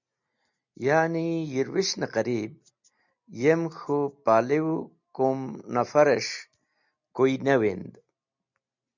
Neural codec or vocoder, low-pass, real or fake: none; 7.2 kHz; real